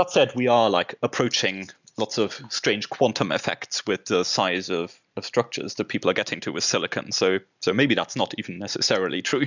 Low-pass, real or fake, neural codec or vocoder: 7.2 kHz; real; none